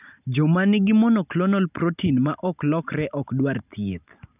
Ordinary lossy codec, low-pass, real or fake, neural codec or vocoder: none; 3.6 kHz; real; none